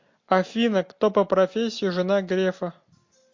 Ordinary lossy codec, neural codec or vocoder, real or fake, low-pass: MP3, 48 kbps; none; real; 7.2 kHz